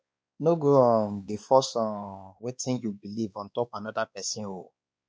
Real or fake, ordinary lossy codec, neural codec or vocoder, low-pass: fake; none; codec, 16 kHz, 2 kbps, X-Codec, WavLM features, trained on Multilingual LibriSpeech; none